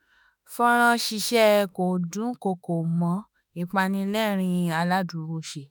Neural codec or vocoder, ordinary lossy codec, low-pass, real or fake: autoencoder, 48 kHz, 32 numbers a frame, DAC-VAE, trained on Japanese speech; none; none; fake